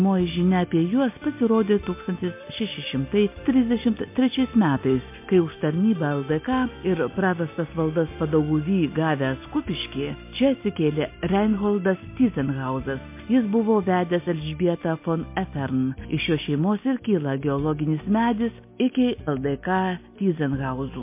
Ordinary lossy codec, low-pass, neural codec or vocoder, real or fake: MP3, 24 kbps; 3.6 kHz; none; real